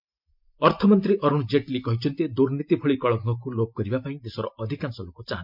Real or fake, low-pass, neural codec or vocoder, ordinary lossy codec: real; 5.4 kHz; none; MP3, 48 kbps